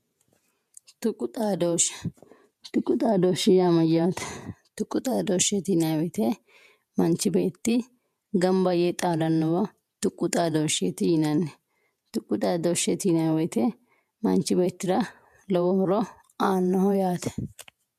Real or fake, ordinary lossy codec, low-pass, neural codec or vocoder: fake; MP3, 96 kbps; 14.4 kHz; vocoder, 48 kHz, 128 mel bands, Vocos